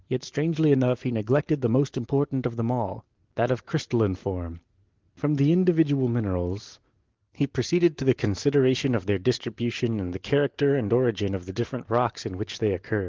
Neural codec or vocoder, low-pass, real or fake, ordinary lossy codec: none; 7.2 kHz; real; Opus, 16 kbps